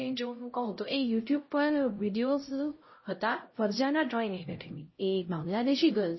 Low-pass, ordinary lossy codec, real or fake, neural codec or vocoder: 7.2 kHz; MP3, 24 kbps; fake; codec, 16 kHz, 0.5 kbps, X-Codec, HuBERT features, trained on LibriSpeech